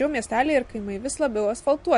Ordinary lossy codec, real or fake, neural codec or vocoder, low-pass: MP3, 48 kbps; real; none; 10.8 kHz